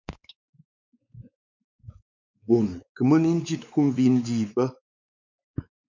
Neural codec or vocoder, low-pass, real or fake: codec, 16 kHz, 4 kbps, X-Codec, WavLM features, trained on Multilingual LibriSpeech; 7.2 kHz; fake